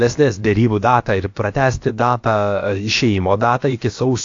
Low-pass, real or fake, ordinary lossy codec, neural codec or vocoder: 7.2 kHz; fake; AAC, 48 kbps; codec, 16 kHz, about 1 kbps, DyCAST, with the encoder's durations